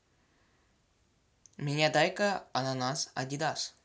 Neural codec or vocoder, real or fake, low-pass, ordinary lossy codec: none; real; none; none